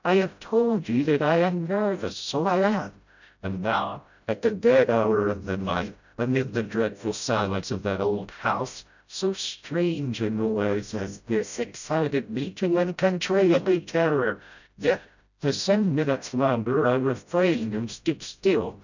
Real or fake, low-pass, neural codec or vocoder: fake; 7.2 kHz; codec, 16 kHz, 0.5 kbps, FreqCodec, smaller model